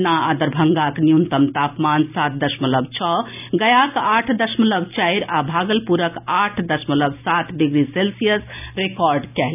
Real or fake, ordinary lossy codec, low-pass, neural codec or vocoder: real; none; 3.6 kHz; none